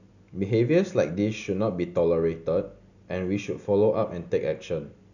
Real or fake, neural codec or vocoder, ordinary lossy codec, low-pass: real; none; none; 7.2 kHz